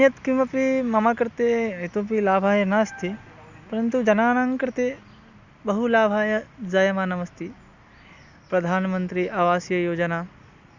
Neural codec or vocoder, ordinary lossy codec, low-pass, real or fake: none; Opus, 64 kbps; 7.2 kHz; real